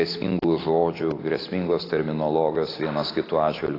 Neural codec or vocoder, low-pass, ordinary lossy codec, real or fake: none; 5.4 kHz; AAC, 24 kbps; real